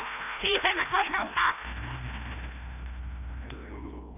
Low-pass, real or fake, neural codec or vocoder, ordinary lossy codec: 3.6 kHz; fake; codec, 16 kHz, 1 kbps, FreqCodec, larger model; none